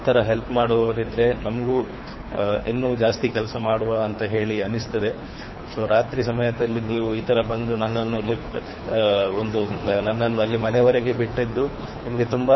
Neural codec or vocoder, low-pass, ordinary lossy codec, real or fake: codec, 24 kHz, 3 kbps, HILCodec; 7.2 kHz; MP3, 24 kbps; fake